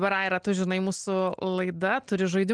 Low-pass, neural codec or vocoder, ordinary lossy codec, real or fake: 9.9 kHz; none; Opus, 24 kbps; real